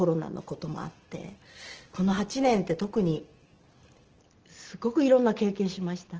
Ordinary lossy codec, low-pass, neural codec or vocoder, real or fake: Opus, 16 kbps; 7.2 kHz; none; real